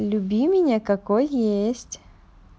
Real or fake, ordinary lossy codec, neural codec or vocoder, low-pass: real; none; none; none